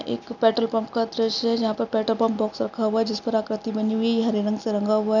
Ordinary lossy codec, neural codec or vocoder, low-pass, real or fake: none; none; 7.2 kHz; real